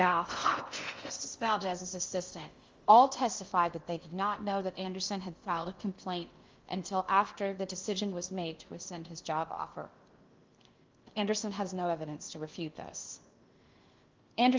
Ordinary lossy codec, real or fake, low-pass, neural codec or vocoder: Opus, 32 kbps; fake; 7.2 kHz; codec, 16 kHz in and 24 kHz out, 0.6 kbps, FocalCodec, streaming, 2048 codes